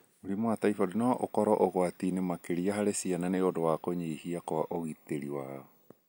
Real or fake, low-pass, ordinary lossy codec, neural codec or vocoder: real; none; none; none